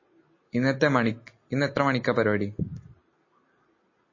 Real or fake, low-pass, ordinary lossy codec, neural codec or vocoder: real; 7.2 kHz; MP3, 32 kbps; none